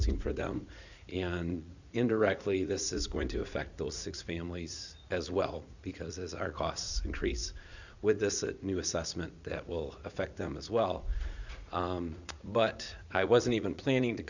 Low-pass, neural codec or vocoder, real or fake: 7.2 kHz; vocoder, 44.1 kHz, 80 mel bands, Vocos; fake